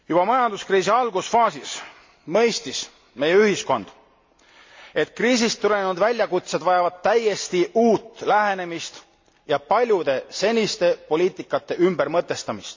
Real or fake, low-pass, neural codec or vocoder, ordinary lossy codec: real; 7.2 kHz; none; MP3, 48 kbps